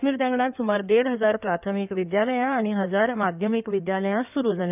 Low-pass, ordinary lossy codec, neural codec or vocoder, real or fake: 3.6 kHz; none; codec, 16 kHz in and 24 kHz out, 2.2 kbps, FireRedTTS-2 codec; fake